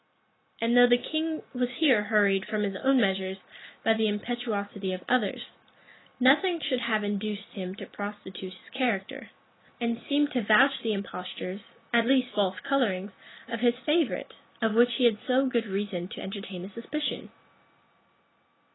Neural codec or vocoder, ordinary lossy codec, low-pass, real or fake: none; AAC, 16 kbps; 7.2 kHz; real